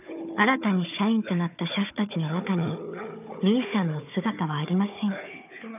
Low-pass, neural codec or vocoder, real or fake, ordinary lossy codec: 3.6 kHz; codec, 16 kHz, 4 kbps, FunCodec, trained on Chinese and English, 50 frames a second; fake; none